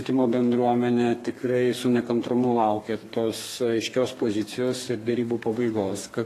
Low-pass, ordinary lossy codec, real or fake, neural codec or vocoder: 14.4 kHz; AAC, 48 kbps; fake; codec, 32 kHz, 1.9 kbps, SNAC